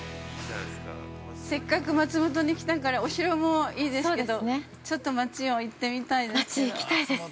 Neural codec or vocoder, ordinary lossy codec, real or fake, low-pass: none; none; real; none